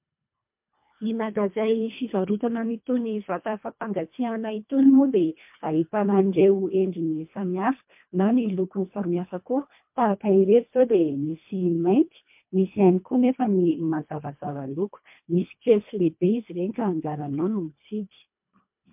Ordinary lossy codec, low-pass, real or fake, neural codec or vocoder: MP3, 32 kbps; 3.6 kHz; fake; codec, 24 kHz, 1.5 kbps, HILCodec